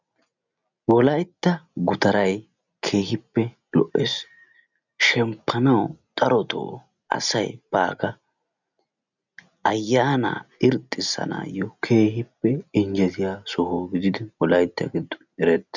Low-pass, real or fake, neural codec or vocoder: 7.2 kHz; real; none